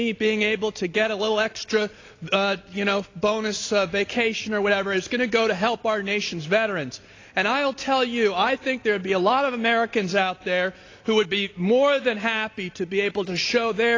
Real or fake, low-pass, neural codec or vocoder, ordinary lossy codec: real; 7.2 kHz; none; AAC, 32 kbps